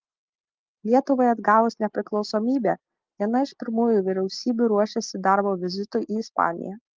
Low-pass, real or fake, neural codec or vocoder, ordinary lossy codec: 7.2 kHz; real; none; Opus, 32 kbps